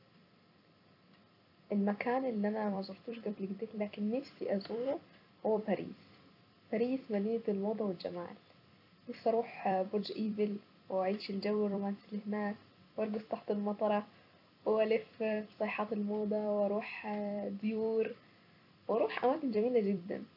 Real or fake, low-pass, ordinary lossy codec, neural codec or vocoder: fake; 5.4 kHz; AAC, 48 kbps; vocoder, 44.1 kHz, 128 mel bands every 256 samples, BigVGAN v2